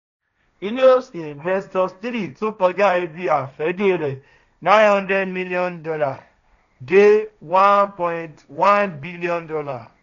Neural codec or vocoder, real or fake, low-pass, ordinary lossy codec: codec, 16 kHz, 1.1 kbps, Voila-Tokenizer; fake; 7.2 kHz; none